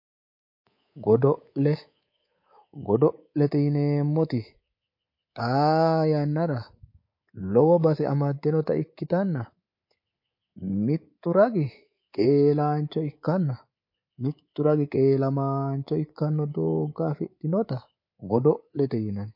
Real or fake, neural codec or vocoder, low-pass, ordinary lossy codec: fake; codec, 24 kHz, 3.1 kbps, DualCodec; 5.4 kHz; MP3, 32 kbps